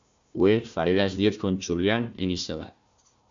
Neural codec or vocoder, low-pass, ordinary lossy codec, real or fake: codec, 16 kHz, 1 kbps, FunCodec, trained on Chinese and English, 50 frames a second; 7.2 kHz; AAC, 64 kbps; fake